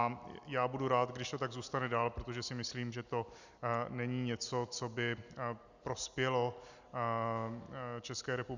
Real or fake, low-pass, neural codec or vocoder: real; 7.2 kHz; none